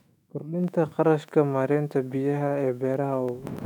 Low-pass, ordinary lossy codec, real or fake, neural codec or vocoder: 19.8 kHz; none; fake; autoencoder, 48 kHz, 128 numbers a frame, DAC-VAE, trained on Japanese speech